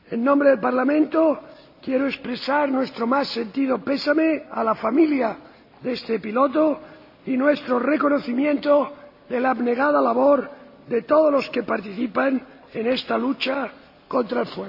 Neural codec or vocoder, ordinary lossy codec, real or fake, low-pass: none; AAC, 48 kbps; real; 5.4 kHz